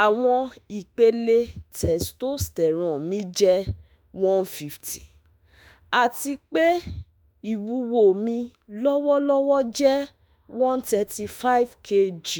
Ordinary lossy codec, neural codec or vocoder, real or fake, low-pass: none; autoencoder, 48 kHz, 32 numbers a frame, DAC-VAE, trained on Japanese speech; fake; none